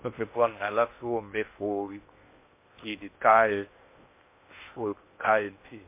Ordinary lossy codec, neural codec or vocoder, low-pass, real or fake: MP3, 24 kbps; codec, 16 kHz in and 24 kHz out, 0.6 kbps, FocalCodec, streaming, 2048 codes; 3.6 kHz; fake